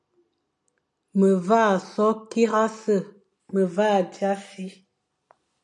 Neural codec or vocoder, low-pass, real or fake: none; 10.8 kHz; real